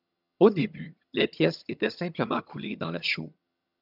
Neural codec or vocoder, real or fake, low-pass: vocoder, 22.05 kHz, 80 mel bands, HiFi-GAN; fake; 5.4 kHz